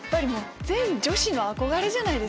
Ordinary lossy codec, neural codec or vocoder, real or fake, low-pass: none; none; real; none